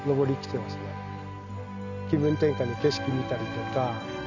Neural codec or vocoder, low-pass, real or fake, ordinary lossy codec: none; 7.2 kHz; real; none